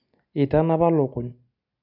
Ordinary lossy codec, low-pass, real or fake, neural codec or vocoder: none; 5.4 kHz; real; none